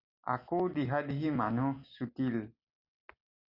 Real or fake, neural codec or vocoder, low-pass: real; none; 5.4 kHz